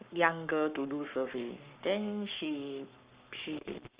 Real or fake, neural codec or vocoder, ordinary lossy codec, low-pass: fake; codec, 44.1 kHz, 7.8 kbps, Pupu-Codec; Opus, 64 kbps; 3.6 kHz